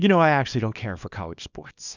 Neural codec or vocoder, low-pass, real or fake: codec, 24 kHz, 0.9 kbps, WavTokenizer, small release; 7.2 kHz; fake